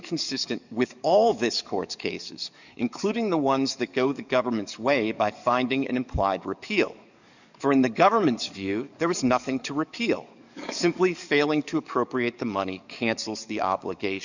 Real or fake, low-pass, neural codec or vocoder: fake; 7.2 kHz; codec, 44.1 kHz, 7.8 kbps, DAC